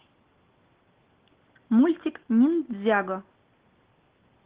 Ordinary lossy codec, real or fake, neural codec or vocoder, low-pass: Opus, 16 kbps; real; none; 3.6 kHz